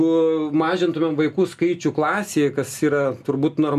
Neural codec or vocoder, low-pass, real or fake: none; 14.4 kHz; real